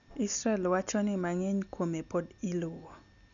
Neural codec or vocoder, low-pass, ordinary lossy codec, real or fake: none; 7.2 kHz; none; real